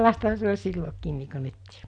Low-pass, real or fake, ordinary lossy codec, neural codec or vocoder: 9.9 kHz; real; none; none